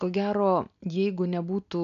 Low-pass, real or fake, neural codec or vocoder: 7.2 kHz; real; none